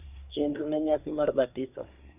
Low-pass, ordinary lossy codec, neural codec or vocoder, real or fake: 3.6 kHz; none; codec, 24 kHz, 1 kbps, SNAC; fake